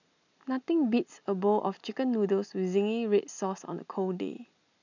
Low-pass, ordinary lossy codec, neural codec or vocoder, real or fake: 7.2 kHz; none; none; real